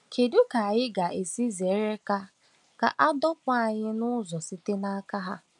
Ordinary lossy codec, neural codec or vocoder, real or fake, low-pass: none; none; real; 10.8 kHz